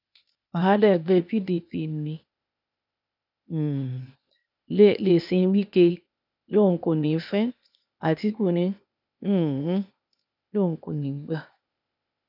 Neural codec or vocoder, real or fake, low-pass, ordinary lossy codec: codec, 16 kHz, 0.8 kbps, ZipCodec; fake; 5.4 kHz; none